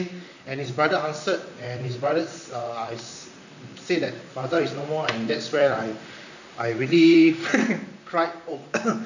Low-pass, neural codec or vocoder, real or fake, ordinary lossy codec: 7.2 kHz; vocoder, 44.1 kHz, 128 mel bands, Pupu-Vocoder; fake; none